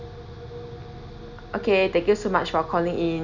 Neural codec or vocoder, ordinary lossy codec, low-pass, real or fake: none; none; 7.2 kHz; real